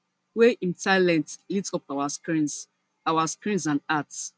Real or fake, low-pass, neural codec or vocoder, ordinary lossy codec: real; none; none; none